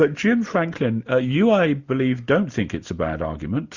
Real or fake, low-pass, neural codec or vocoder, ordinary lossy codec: real; 7.2 kHz; none; Opus, 64 kbps